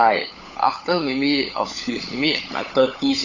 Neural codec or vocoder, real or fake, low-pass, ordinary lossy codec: codec, 16 kHz, 16 kbps, FunCodec, trained on LibriTTS, 50 frames a second; fake; 7.2 kHz; none